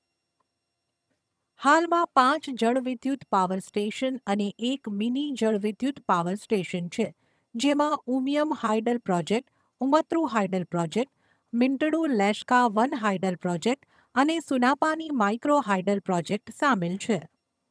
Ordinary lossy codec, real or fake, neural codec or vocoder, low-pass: none; fake; vocoder, 22.05 kHz, 80 mel bands, HiFi-GAN; none